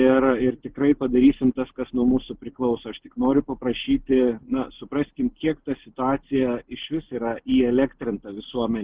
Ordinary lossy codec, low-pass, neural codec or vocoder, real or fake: Opus, 16 kbps; 3.6 kHz; none; real